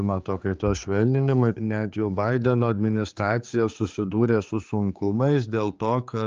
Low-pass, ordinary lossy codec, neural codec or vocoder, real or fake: 7.2 kHz; Opus, 24 kbps; codec, 16 kHz, 4 kbps, X-Codec, HuBERT features, trained on general audio; fake